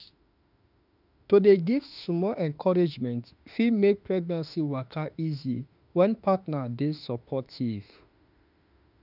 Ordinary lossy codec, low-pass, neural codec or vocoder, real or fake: none; 5.4 kHz; autoencoder, 48 kHz, 32 numbers a frame, DAC-VAE, trained on Japanese speech; fake